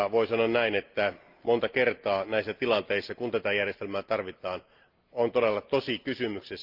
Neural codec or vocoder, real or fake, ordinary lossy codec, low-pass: none; real; Opus, 32 kbps; 5.4 kHz